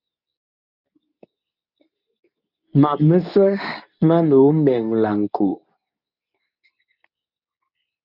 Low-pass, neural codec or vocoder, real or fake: 5.4 kHz; codec, 24 kHz, 0.9 kbps, WavTokenizer, medium speech release version 2; fake